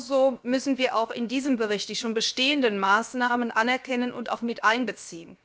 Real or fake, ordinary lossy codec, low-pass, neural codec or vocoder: fake; none; none; codec, 16 kHz, 0.7 kbps, FocalCodec